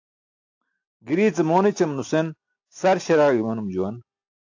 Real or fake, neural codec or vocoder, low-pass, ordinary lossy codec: real; none; 7.2 kHz; AAC, 48 kbps